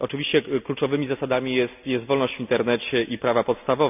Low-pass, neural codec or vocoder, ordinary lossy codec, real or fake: 3.6 kHz; none; none; real